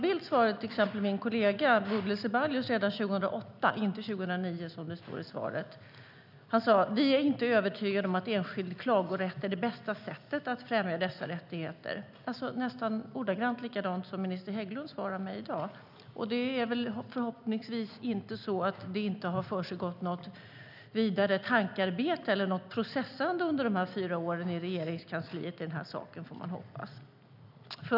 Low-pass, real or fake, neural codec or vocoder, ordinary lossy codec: 5.4 kHz; real; none; none